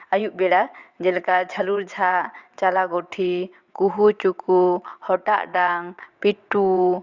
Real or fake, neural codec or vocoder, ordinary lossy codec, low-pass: fake; vocoder, 22.05 kHz, 80 mel bands, WaveNeXt; Opus, 64 kbps; 7.2 kHz